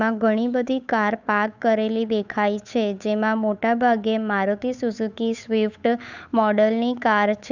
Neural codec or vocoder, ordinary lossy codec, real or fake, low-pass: codec, 16 kHz, 8 kbps, FunCodec, trained on Chinese and English, 25 frames a second; none; fake; 7.2 kHz